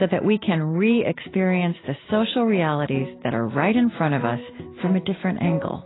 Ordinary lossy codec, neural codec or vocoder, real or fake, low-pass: AAC, 16 kbps; none; real; 7.2 kHz